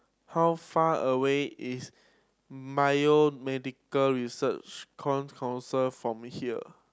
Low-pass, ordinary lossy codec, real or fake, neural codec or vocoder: none; none; real; none